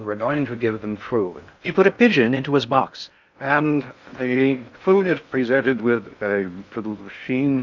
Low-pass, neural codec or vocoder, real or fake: 7.2 kHz; codec, 16 kHz in and 24 kHz out, 0.6 kbps, FocalCodec, streaming, 4096 codes; fake